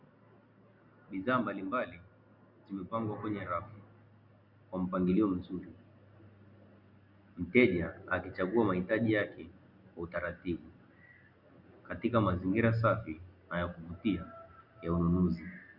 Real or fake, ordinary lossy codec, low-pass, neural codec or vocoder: real; Opus, 64 kbps; 5.4 kHz; none